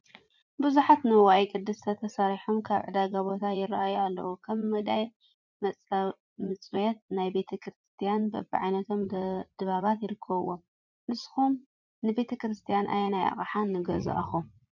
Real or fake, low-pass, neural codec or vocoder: fake; 7.2 kHz; vocoder, 44.1 kHz, 80 mel bands, Vocos